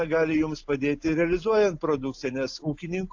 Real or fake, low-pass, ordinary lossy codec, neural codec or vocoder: real; 7.2 kHz; AAC, 48 kbps; none